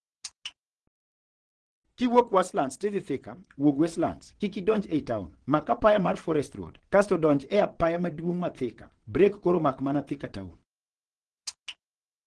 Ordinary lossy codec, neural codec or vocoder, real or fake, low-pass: Opus, 16 kbps; vocoder, 22.05 kHz, 80 mel bands, WaveNeXt; fake; 9.9 kHz